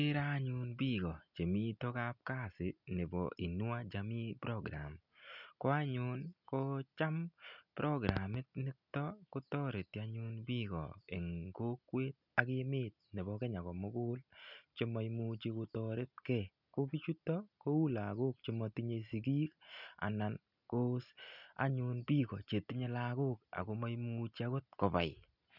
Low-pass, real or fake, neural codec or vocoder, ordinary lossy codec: 5.4 kHz; real; none; none